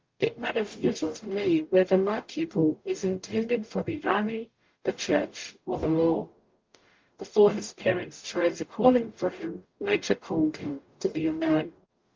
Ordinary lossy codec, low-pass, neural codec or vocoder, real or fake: Opus, 32 kbps; 7.2 kHz; codec, 44.1 kHz, 0.9 kbps, DAC; fake